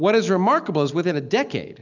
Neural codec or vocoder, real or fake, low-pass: none; real; 7.2 kHz